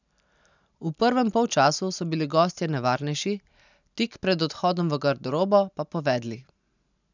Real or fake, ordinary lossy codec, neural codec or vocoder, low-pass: real; none; none; 7.2 kHz